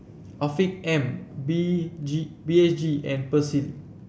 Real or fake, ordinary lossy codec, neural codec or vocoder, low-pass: real; none; none; none